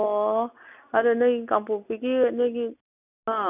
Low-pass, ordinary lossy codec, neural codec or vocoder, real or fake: 3.6 kHz; none; none; real